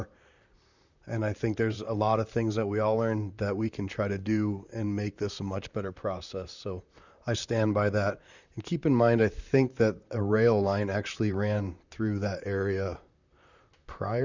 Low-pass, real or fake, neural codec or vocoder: 7.2 kHz; fake; vocoder, 44.1 kHz, 128 mel bands, Pupu-Vocoder